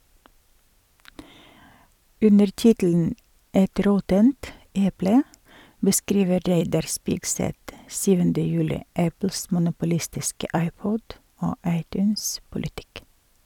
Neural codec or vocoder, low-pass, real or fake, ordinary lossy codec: vocoder, 44.1 kHz, 128 mel bands every 256 samples, BigVGAN v2; 19.8 kHz; fake; none